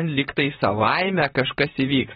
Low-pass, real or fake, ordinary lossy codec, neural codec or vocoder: 19.8 kHz; fake; AAC, 16 kbps; vocoder, 44.1 kHz, 128 mel bands, Pupu-Vocoder